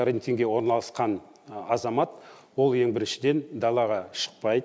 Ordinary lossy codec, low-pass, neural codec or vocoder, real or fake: none; none; none; real